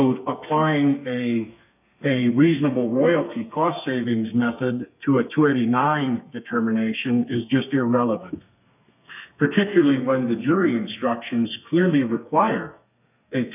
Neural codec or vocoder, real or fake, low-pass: codec, 32 kHz, 1.9 kbps, SNAC; fake; 3.6 kHz